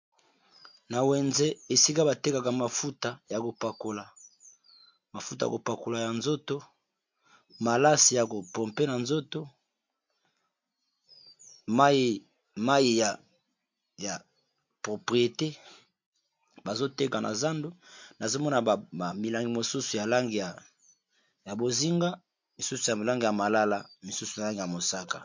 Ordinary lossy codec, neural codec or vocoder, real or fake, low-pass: MP3, 48 kbps; none; real; 7.2 kHz